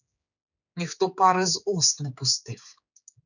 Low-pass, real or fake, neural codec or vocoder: 7.2 kHz; fake; codec, 16 kHz, 4 kbps, X-Codec, HuBERT features, trained on general audio